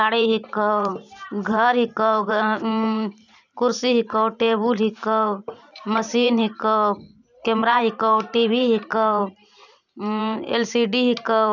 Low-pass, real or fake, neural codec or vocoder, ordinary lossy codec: 7.2 kHz; fake; vocoder, 44.1 kHz, 128 mel bands every 512 samples, BigVGAN v2; none